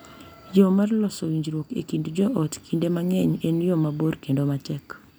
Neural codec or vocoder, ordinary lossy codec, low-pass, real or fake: none; none; none; real